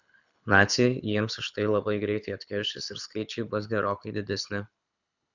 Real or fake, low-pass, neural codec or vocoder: fake; 7.2 kHz; codec, 24 kHz, 6 kbps, HILCodec